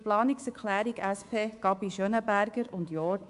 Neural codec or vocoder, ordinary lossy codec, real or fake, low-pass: codec, 24 kHz, 3.1 kbps, DualCodec; AAC, 64 kbps; fake; 10.8 kHz